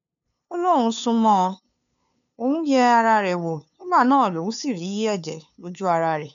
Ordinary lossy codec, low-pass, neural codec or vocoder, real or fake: none; 7.2 kHz; codec, 16 kHz, 2 kbps, FunCodec, trained on LibriTTS, 25 frames a second; fake